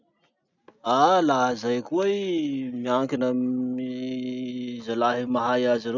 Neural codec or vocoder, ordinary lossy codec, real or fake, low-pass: none; none; real; 7.2 kHz